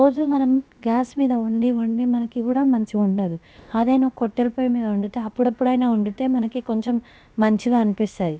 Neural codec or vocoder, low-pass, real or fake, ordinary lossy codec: codec, 16 kHz, about 1 kbps, DyCAST, with the encoder's durations; none; fake; none